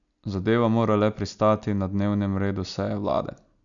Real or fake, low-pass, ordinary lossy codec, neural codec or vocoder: real; 7.2 kHz; none; none